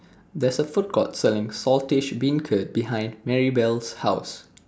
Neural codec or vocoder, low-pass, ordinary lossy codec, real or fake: none; none; none; real